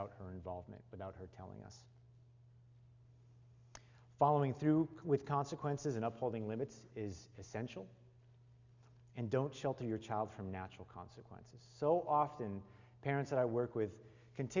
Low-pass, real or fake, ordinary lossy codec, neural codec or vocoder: 7.2 kHz; real; Opus, 64 kbps; none